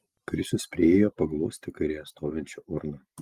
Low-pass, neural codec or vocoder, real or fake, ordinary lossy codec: 14.4 kHz; none; real; Opus, 24 kbps